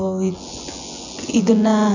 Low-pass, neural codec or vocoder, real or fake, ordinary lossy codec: 7.2 kHz; vocoder, 24 kHz, 100 mel bands, Vocos; fake; none